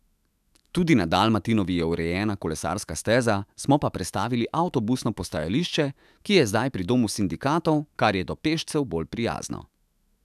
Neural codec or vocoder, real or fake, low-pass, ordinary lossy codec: autoencoder, 48 kHz, 128 numbers a frame, DAC-VAE, trained on Japanese speech; fake; 14.4 kHz; none